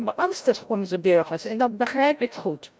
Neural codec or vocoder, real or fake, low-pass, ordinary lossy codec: codec, 16 kHz, 0.5 kbps, FreqCodec, larger model; fake; none; none